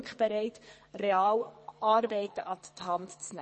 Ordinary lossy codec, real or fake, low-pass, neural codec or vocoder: MP3, 32 kbps; fake; 10.8 kHz; codec, 44.1 kHz, 2.6 kbps, SNAC